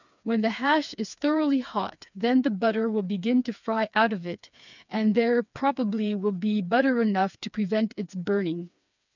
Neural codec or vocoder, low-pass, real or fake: codec, 16 kHz, 4 kbps, FreqCodec, smaller model; 7.2 kHz; fake